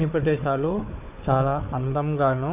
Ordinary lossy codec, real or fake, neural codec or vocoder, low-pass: AAC, 32 kbps; fake; codec, 16 kHz, 4 kbps, FunCodec, trained on Chinese and English, 50 frames a second; 3.6 kHz